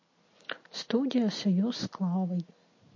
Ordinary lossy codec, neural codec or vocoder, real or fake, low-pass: MP3, 32 kbps; none; real; 7.2 kHz